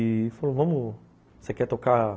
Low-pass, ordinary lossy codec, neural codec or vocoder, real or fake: none; none; none; real